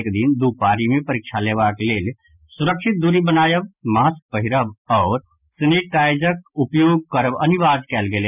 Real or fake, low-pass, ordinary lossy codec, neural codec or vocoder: real; 3.6 kHz; none; none